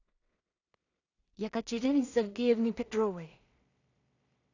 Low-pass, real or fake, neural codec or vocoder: 7.2 kHz; fake; codec, 16 kHz in and 24 kHz out, 0.4 kbps, LongCat-Audio-Codec, two codebook decoder